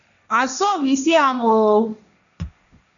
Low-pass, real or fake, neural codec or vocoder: 7.2 kHz; fake; codec, 16 kHz, 1.1 kbps, Voila-Tokenizer